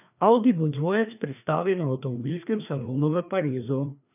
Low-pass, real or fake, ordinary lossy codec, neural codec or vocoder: 3.6 kHz; fake; none; codec, 16 kHz, 2 kbps, FreqCodec, larger model